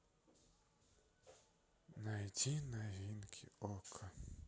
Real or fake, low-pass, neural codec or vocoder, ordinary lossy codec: real; none; none; none